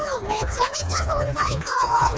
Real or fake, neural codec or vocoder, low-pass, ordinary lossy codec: fake; codec, 16 kHz, 2 kbps, FreqCodec, smaller model; none; none